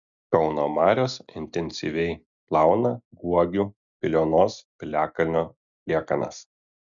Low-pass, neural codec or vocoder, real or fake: 7.2 kHz; none; real